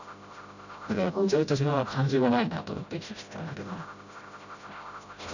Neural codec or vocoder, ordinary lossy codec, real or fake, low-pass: codec, 16 kHz, 0.5 kbps, FreqCodec, smaller model; none; fake; 7.2 kHz